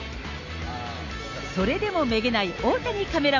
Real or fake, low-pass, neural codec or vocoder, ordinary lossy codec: real; 7.2 kHz; none; none